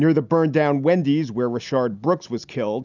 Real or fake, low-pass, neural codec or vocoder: real; 7.2 kHz; none